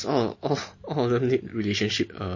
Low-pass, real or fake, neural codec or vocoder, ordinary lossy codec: 7.2 kHz; real; none; MP3, 32 kbps